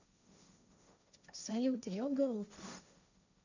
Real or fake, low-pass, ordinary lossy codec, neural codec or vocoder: fake; 7.2 kHz; none; codec, 16 kHz, 1.1 kbps, Voila-Tokenizer